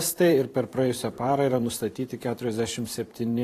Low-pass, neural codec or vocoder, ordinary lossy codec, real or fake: 14.4 kHz; vocoder, 44.1 kHz, 128 mel bands every 512 samples, BigVGAN v2; AAC, 48 kbps; fake